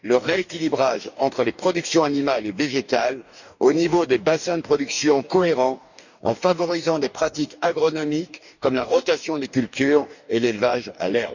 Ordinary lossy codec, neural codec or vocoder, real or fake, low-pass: none; codec, 44.1 kHz, 2.6 kbps, DAC; fake; 7.2 kHz